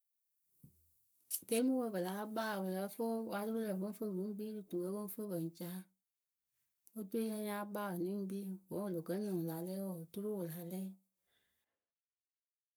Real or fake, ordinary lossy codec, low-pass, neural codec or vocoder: fake; none; none; codec, 44.1 kHz, 7.8 kbps, Pupu-Codec